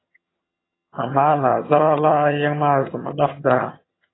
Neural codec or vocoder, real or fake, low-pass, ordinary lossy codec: vocoder, 22.05 kHz, 80 mel bands, HiFi-GAN; fake; 7.2 kHz; AAC, 16 kbps